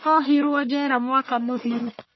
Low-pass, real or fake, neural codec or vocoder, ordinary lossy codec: 7.2 kHz; fake; codec, 44.1 kHz, 1.7 kbps, Pupu-Codec; MP3, 24 kbps